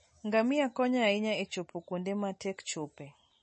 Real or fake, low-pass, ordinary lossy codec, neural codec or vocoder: real; 10.8 kHz; MP3, 32 kbps; none